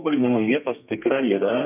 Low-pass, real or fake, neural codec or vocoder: 3.6 kHz; fake; codec, 32 kHz, 1.9 kbps, SNAC